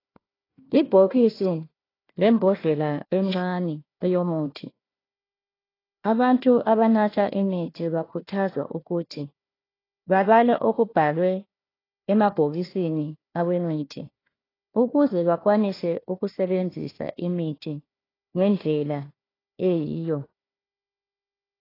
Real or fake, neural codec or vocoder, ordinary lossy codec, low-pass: fake; codec, 16 kHz, 1 kbps, FunCodec, trained on Chinese and English, 50 frames a second; AAC, 24 kbps; 5.4 kHz